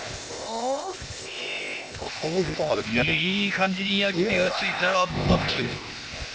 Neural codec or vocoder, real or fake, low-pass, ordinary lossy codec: codec, 16 kHz, 0.8 kbps, ZipCodec; fake; none; none